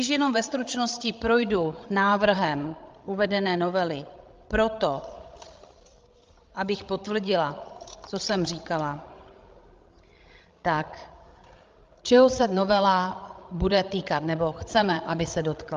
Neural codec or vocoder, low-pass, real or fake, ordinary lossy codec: codec, 16 kHz, 16 kbps, FreqCodec, larger model; 7.2 kHz; fake; Opus, 32 kbps